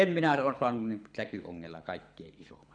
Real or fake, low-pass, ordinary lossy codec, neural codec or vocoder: fake; 9.9 kHz; none; codec, 24 kHz, 6 kbps, HILCodec